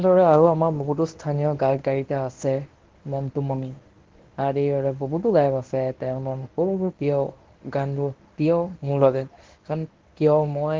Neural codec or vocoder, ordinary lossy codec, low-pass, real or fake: codec, 24 kHz, 0.9 kbps, WavTokenizer, medium speech release version 1; Opus, 16 kbps; 7.2 kHz; fake